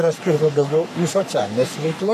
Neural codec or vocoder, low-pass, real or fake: codec, 44.1 kHz, 3.4 kbps, Pupu-Codec; 14.4 kHz; fake